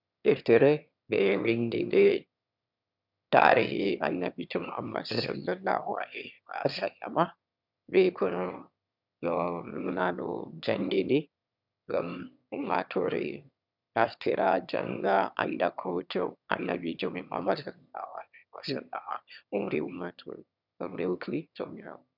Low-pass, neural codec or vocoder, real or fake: 5.4 kHz; autoencoder, 22.05 kHz, a latent of 192 numbers a frame, VITS, trained on one speaker; fake